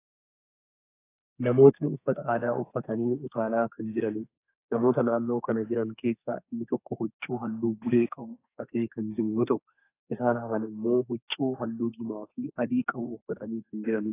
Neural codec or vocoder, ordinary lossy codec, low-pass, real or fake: codec, 32 kHz, 1.9 kbps, SNAC; AAC, 24 kbps; 3.6 kHz; fake